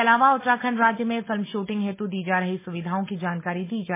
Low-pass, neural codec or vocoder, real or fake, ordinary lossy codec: 3.6 kHz; none; real; MP3, 16 kbps